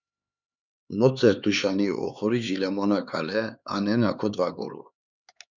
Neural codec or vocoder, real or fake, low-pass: codec, 16 kHz, 4 kbps, X-Codec, HuBERT features, trained on LibriSpeech; fake; 7.2 kHz